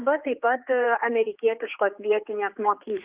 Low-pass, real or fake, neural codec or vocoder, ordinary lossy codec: 3.6 kHz; fake; codec, 16 kHz, 4 kbps, X-Codec, HuBERT features, trained on general audio; Opus, 32 kbps